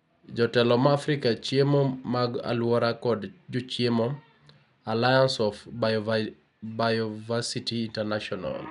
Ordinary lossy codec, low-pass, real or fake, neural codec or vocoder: none; 10.8 kHz; real; none